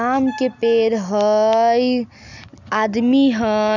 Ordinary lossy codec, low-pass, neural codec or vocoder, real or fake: none; 7.2 kHz; none; real